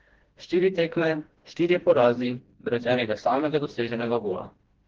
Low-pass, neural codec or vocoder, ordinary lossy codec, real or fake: 7.2 kHz; codec, 16 kHz, 1 kbps, FreqCodec, smaller model; Opus, 16 kbps; fake